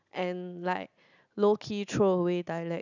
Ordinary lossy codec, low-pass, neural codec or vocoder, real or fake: none; 7.2 kHz; none; real